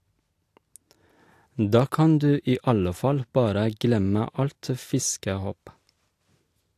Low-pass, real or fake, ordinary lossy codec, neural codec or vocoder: 14.4 kHz; real; AAC, 64 kbps; none